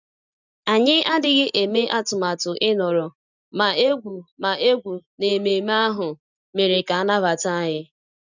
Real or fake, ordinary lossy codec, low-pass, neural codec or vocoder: real; none; 7.2 kHz; none